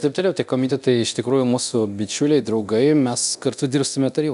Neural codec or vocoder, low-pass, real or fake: codec, 24 kHz, 0.9 kbps, DualCodec; 10.8 kHz; fake